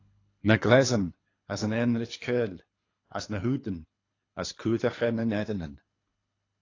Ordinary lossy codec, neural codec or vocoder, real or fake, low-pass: AAC, 32 kbps; codec, 24 kHz, 3 kbps, HILCodec; fake; 7.2 kHz